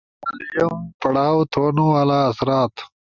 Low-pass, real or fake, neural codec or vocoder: 7.2 kHz; real; none